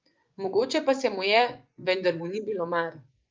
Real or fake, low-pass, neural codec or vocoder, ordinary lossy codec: fake; 7.2 kHz; vocoder, 24 kHz, 100 mel bands, Vocos; Opus, 24 kbps